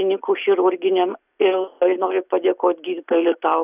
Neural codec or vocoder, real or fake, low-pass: none; real; 3.6 kHz